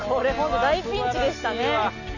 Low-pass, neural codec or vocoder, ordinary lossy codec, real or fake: 7.2 kHz; none; none; real